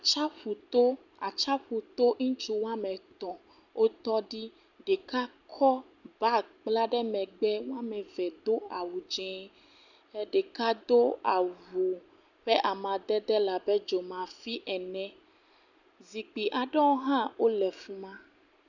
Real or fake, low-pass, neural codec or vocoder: real; 7.2 kHz; none